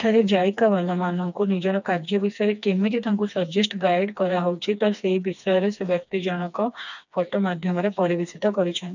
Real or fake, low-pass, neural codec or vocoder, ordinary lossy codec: fake; 7.2 kHz; codec, 16 kHz, 2 kbps, FreqCodec, smaller model; none